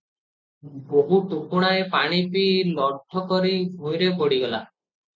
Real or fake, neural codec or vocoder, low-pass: real; none; 7.2 kHz